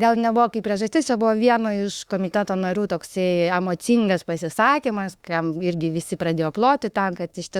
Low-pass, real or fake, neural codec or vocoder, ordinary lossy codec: 19.8 kHz; fake; autoencoder, 48 kHz, 32 numbers a frame, DAC-VAE, trained on Japanese speech; Opus, 64 kbps